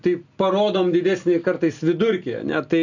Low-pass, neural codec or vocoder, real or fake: 7.2 kHz; none; real